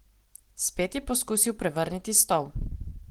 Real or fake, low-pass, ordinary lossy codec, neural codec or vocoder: real; 19.8 kHz; Opus, 16 kbps; none